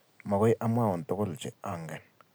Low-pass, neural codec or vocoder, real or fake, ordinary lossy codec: none; none; real; none